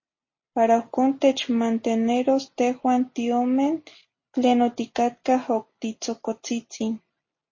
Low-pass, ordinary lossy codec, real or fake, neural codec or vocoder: 7.2 kHz; MP3, 32 kbps; real; none